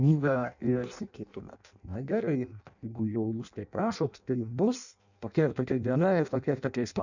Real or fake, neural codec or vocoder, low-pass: fake; codec, 16 kHz in and 24 kHz out, 0.6 kbps, FireRedTTS-2 codec; 7.2 kHz